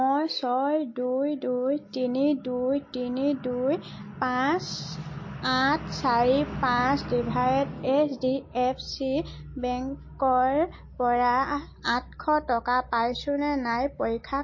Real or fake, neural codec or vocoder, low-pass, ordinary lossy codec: real; none; 7.2 kHz; MP3, 32 kbps